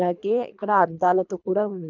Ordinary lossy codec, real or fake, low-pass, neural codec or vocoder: none; fake; 7.2 kHz; codec, 24 kHz, 3 kbps, HILCodec